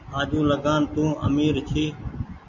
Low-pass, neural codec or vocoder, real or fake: 7.2 kHz; none; real